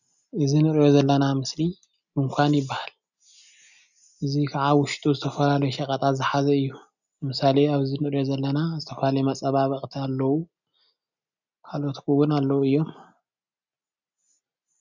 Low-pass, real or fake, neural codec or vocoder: 7.2 kHz; real; none